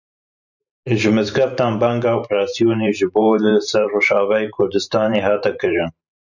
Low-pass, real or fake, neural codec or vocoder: 7.2 kHz; fake; vocoder, 44.1 kHz, 128 mel bands every 512 samples, BigVGAN v2